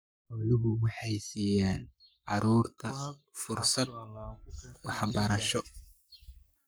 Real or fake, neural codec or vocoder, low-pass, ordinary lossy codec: fake; codec, 44.1 kHz, 7.8 kbps, Pupu-Codec; none; none